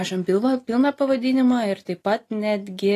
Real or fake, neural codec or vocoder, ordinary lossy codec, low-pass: real; none; AAC, 48 kbps; 14.4 kHz